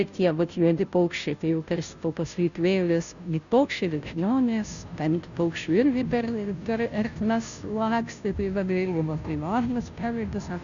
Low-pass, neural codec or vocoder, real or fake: 7.2 kHz; codec, 16 kHz, 0.5 kbps, FunCodec, trained on Chinese and English, 25 frames a second; fake